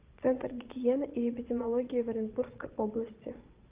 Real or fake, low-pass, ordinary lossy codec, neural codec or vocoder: fake; 3.6 kHz; Opus, 32 kbps; vocoder, 22.05 kHz, 80 mel bands, Vocos